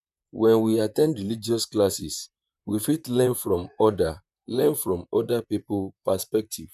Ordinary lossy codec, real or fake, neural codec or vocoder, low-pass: none; fake; vocoder, 44.1 kHz, 128 mel bands, Pupu-Vocoder; 14.4 kHz